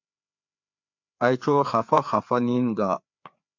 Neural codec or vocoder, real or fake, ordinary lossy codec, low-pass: codec, 16 kHz, 4 kbps, FreqCodec, larger model; fake; MP3, 48 kbps; 7.2 kHz